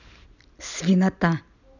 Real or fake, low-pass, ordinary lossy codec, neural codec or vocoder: real; 7.2 kHz; none; none